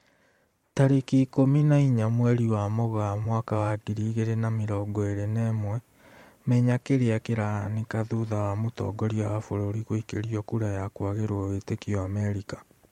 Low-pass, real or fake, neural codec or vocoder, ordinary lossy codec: 19.8 kHz; fake; vocoder, 44.1 kHz, 128 mel bands every 512 samples, BigVGAN v2; MP3, 64 kbps